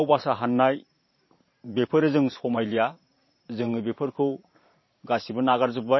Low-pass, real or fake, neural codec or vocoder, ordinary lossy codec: 7.2 kHz; fake; vocoder, 44.1 kHz, 128 mel bands every 512 samples, BigVGAN v2; MP3, 24 kbps